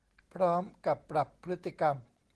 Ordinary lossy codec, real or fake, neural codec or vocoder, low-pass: Opus, 16 kbps; real; none; 10.8 kHz